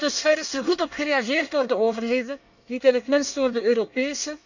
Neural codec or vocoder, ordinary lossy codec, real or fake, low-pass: codec, 24 kHz, 1 kbps, SNAC; none; fake; 7.2 kHz